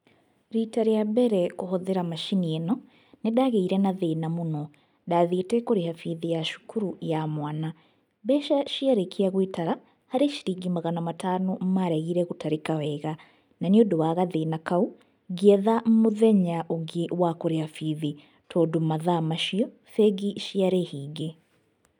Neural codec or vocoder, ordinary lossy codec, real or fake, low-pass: none; none; real; 19.8 kHz